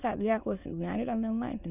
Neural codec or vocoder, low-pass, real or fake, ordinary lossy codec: autoencoder, 22.05 kHz, a latent of 192 numbers a frame, VITS, trained on many speakers; 3.6 kHz; fake; none